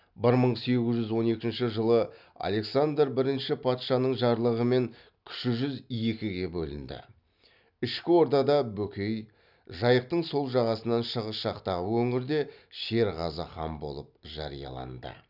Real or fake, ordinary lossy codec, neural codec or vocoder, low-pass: real; none; none; 5.4 kHz